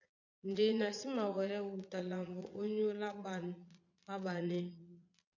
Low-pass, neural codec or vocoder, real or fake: 7.2 kHz; vocoder, 22.05 kHz, 80 mel bands, Vocos; fake